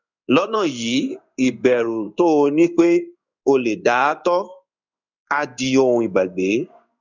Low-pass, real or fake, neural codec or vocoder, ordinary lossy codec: 7.2 kHz; fake; codec, 16 kHz in and 24 kHz out, 1 kbps, XY-Tokenizer; none